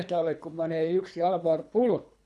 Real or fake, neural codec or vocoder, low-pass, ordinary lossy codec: fake; codec, 24 kHz, 3 kbps, HILCodec; none; none